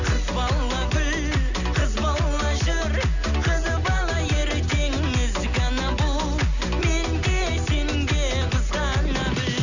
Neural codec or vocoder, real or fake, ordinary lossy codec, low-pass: none; real; none; 7.2 kHz